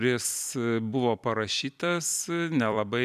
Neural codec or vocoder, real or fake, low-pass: vocoder, 44.1 kHz, 128 mel bands every 256 samples, BigVGAN v2; fake; 14.4 kHz